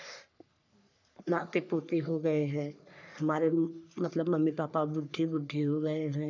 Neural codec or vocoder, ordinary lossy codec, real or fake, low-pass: codec, 44.1 kHz, 3.4 kbps, Pupu-Codec; none; fake; 7.2 kHz